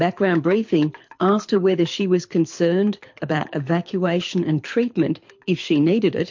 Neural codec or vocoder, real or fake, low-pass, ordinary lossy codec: codec, 24 kHz, 6 kbps, HILCodec; fake; 7.2 kHz; MP3, 48 kbps